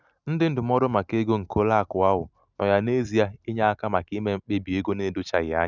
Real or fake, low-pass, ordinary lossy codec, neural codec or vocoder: real; 7.2 kHz; none; none